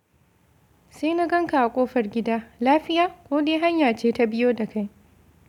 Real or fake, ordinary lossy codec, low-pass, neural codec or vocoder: real; none; 19.8 kHz; none